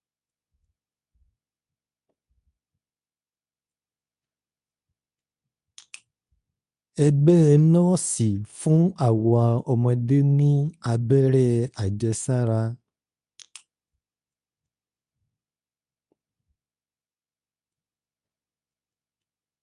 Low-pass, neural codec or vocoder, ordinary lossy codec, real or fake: 10.8 kHz; codec, 24 kHz, 0.9 kbps, WavTokenizer, medium speech release version 2; none; fake